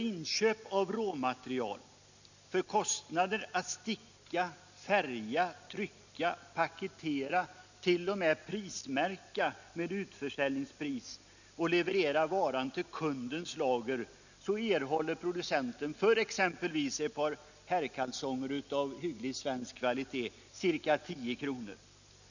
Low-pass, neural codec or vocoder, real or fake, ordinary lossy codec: 7.2 kHz; none; real; none